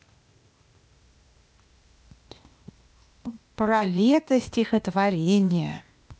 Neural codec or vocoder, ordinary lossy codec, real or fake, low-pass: codec, 16 kHz, 0.8 kbps, ZipCodec; none; fake; none